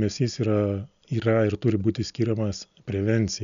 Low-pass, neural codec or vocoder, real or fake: 7.2 kHz; none; real